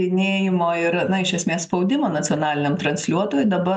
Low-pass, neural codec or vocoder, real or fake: 10.8 kHz; none; real